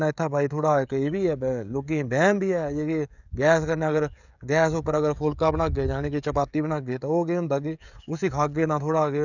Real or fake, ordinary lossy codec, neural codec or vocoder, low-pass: fake; none; codec, 16 kHz, 16 kbps, FreqCodec, smaller model; 7.2 kHz